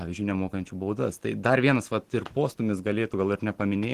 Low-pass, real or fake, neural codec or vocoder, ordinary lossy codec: 14.4 kHz; real; none; Opus, 16 kbps